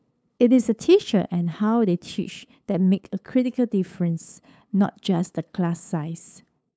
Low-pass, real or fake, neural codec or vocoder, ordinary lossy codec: none; fake; codec, 16 kHz, 8 kbps, FunCodec, trained on LibriTTS, 25 frames a second; none